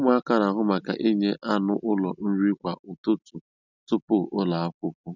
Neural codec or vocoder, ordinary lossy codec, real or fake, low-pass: none; none; real; 7.2 kHz